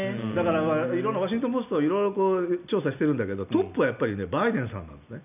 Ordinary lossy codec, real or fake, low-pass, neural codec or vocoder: none; real; 3.6 kHz; none